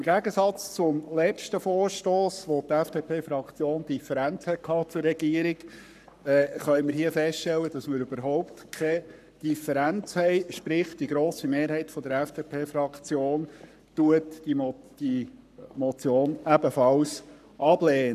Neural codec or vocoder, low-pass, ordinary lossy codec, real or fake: codec, 44.1 kHz, 7.8 kbps, Pupu-Codec; 14.4 kHz; none; fake